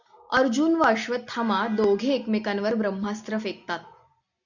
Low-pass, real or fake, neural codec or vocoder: 7.2 kHz; real; none